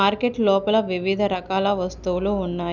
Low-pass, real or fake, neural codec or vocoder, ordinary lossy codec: 7.2 kHz; real; none; none